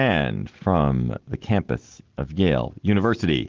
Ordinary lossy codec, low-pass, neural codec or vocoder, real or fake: Opus, 16 kbps; 7.2 kHz; none; real